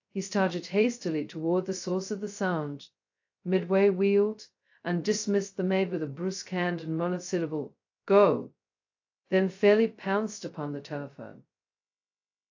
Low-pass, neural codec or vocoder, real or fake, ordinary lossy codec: 7.2 kHz; codec, 16 kHz, 0.2 kbps, FocalCodec; fake; AAC, 48 kbps